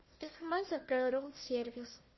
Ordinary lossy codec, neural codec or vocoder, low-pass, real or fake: MP3, 24 kbps; codec, 16 kHz, 1 kbps, FunCodec, trained on Chinese and English, 50 frames a second; 7.2 kHz; fake